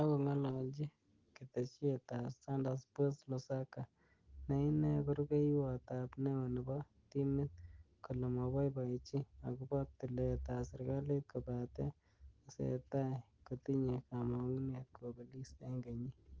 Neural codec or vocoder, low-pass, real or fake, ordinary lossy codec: none; 7.2 kHz; real; Opus, 16 kbps